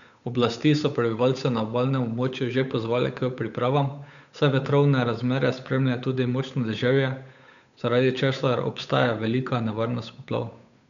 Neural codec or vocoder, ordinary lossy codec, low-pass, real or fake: codec, 16 kHz, 8 kbps, FunCodec, trained on Chinese and English, 25 frames a second; none; 7.2 kHz; fake